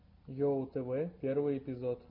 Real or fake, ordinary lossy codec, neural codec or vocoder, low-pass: real; MP3, 32 kbps; none; 5.4 kHz